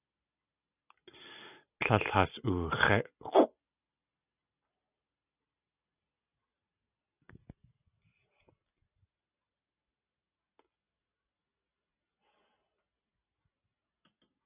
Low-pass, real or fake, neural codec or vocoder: 3.6 kHz; real; none